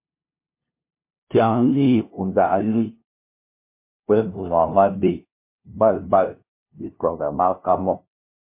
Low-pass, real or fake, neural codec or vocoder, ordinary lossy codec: 3.6 kHz; fake; codec, 16 kHz, 0.5 kbps, FunCodec, trained on LibriTTS, 25 frames a second; MP3, 24 kbps